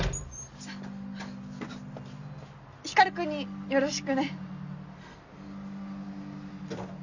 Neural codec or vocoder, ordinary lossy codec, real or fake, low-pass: none; none; real; 7.2 kHz